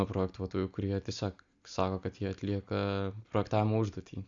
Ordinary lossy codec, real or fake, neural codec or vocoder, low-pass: Opus, 64 kbps; real; none; 7.2 kHz